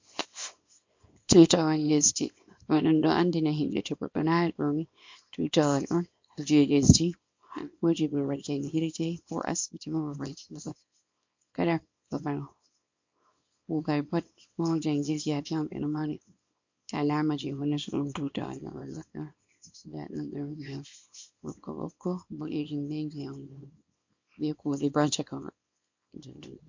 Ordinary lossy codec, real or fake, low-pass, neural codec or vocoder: MP3, 48 kbps; fake; 7.2 kHz; codec, 24 kHz, 0.9 kbps, WavTokenizer, small release